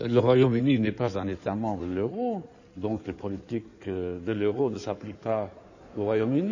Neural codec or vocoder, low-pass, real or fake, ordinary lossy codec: codec, 16 kHz in and 24 kHz out, 2.2 kbps, FireRedTTS-2 codec; 7.2 kHz; fake; none